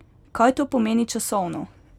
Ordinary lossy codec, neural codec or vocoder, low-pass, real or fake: none; none; 19.8 kHz; real